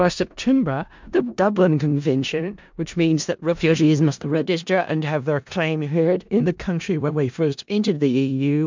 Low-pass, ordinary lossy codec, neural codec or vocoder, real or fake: 7.2 kHz; MP3, 64 kbps; codec, 16 kHz in and 24 kHz out, 0.4 kbps, LongCat-Audio-Codec, four codebook decoder; fake